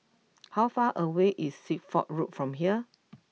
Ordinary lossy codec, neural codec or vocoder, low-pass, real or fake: none; none; none; real